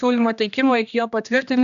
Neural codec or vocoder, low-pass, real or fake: codec, 16 kHz, 1 kbps, X-Codec, HuBERT features, trained on general audio; 7.2 kHz; fake